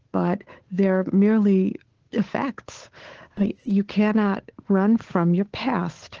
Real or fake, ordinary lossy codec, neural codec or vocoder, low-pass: fake; Opus, 16 kbps; codec, 16 kHz, 8 kbps, FunCodec, trained on Chinese and English, 25 frames a second; 7.2 kHz